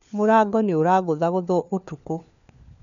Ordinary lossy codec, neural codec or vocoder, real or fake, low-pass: none; codec, 16 kHz, 4 kbps, FunCodec, trained on LibriTTS, 50 frames a second; fake; 7.2 kHz